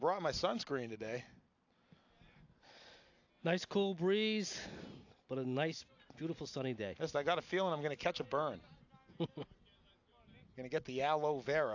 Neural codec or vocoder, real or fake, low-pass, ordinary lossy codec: none; real; 7.2 kHz; AAC, 48 kbps